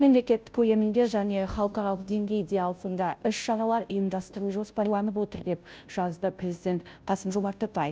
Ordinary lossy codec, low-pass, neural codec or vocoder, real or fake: none; none; codec, 16 kHz, 0.5 kbps, FunCodec, trained on Chinese and English, 25 frames a second; fake